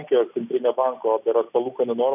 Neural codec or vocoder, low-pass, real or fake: none; 3.6 kHz; real